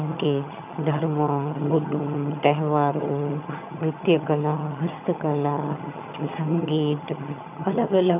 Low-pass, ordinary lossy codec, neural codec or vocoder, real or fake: 3.6 kHz; none; vocoder, 22.05 kHz, 80 mel bands, HiFi-GAN; fake